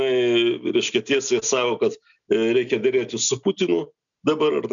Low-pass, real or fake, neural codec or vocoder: 7.2 kHz; real; none